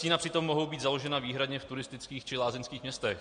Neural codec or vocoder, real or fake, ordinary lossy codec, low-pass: none; real; MP3, 96 kbps; 9.9 kHz